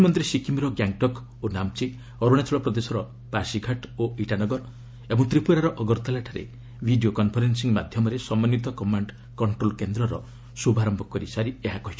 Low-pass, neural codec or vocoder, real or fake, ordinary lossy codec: none; none; real; none